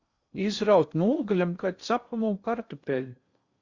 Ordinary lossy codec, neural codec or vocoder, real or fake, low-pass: Opus, 64 kbps; codec, 16 kHz in and 24 kHz out, 0.6 kbps, FocalCodec, streaming, 2048 codes; fake; 7.2 kHz